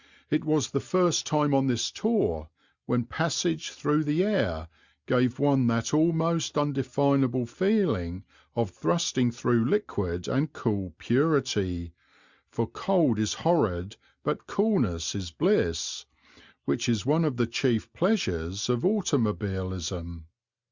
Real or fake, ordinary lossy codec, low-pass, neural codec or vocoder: real; Opus, 64 kbps; 7.2 kHz; none